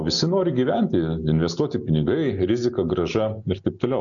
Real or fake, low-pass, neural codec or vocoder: real; 7.2 kHz; none